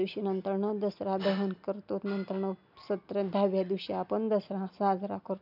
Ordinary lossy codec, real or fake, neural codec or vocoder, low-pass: none; real; none; 5.4 kHz